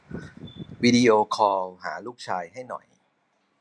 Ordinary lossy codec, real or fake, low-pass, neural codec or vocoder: none; real; none; none